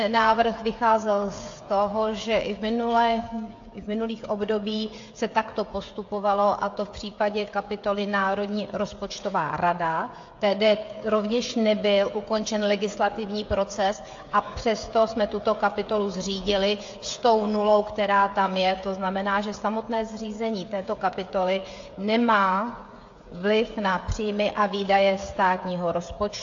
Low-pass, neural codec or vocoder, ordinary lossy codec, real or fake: 7.2 kHz; codec, 16 kHz, 16 kbps, FreqCodec, smaller model; AAC, 48 kbps; fake